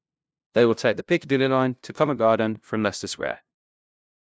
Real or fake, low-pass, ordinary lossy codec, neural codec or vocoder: fake; none; none; codec, 16 kHz, 0.5 kbps, FunCodec, trained on LibriTTS, 25 frames a second